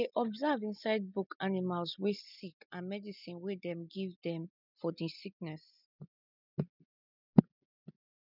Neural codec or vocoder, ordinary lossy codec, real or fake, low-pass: none; none; real; 5.4 kHz